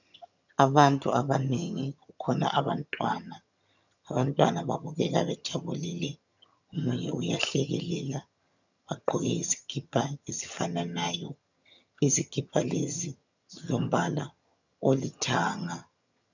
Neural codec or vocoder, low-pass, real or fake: vocoder, 22.05 kHz, 80 mel bands, HiFi-GAN; 7.2 kHz; fake